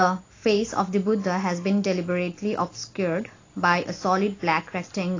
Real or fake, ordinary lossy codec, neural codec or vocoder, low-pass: real; AAC, 32 kbps; none; 7.2 kHz